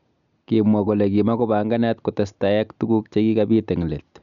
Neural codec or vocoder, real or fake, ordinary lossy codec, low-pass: none; real; none; 7.2 kHz